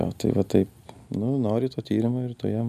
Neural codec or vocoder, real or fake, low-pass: none; real; 14.4 kHz